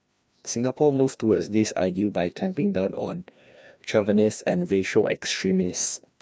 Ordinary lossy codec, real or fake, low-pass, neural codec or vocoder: none; fake; none; codec, 16 kHz, 1 kbps, FreqCodec, larger model